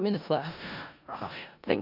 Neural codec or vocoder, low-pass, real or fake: codec, 16 kHz in and 24 kHz out, 0.4 kbps, LongCat-Audio-Codec, four codebook decoder; 5.4 kHz; fake